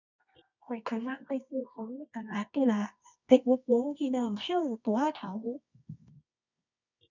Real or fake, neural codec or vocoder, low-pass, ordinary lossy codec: fake; codec, 24 kHz, 0.9 kbps, WavTokenizer, medium music audio release; 7.2 kHz; none